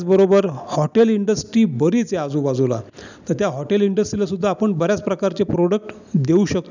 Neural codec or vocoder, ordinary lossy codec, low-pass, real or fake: none; none; 7.2 kHz; real